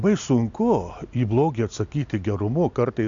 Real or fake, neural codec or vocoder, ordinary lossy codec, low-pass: real; none; AAC, 64 kbps; 7.2 kHz